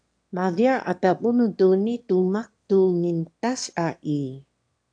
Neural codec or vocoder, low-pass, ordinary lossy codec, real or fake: autoencoder, 22.05 kHz, a latent of 192 numbers a frame, VITS, trained on one speaker; 9.9 kHz; AAC, 64 kbps; fake